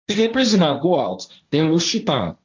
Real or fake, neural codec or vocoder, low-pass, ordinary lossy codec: fake; codec, 16 kHz, 1.1 kbps, Voila-Tokenizer; 7.2 kHz; AAC, 48 kbps